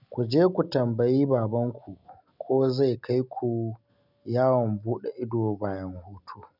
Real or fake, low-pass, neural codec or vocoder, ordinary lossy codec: real; 5.4 kHz; none; none